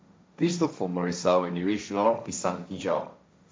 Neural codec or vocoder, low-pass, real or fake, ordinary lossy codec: codec, 16 kHz, 1.1 kbps, Voila-Tokenizer; none; fake; none